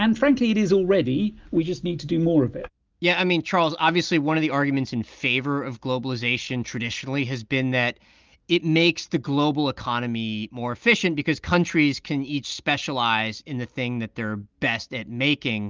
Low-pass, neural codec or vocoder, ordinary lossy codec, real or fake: 7.2 kHz; none; Opus, 32 kbps; real